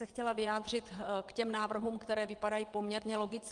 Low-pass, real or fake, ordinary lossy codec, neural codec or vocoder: 9.9 kHz; fake; Opus, 24 kbps; vocoder, 22.05 kHz, 80 mel bands, WaveNeXt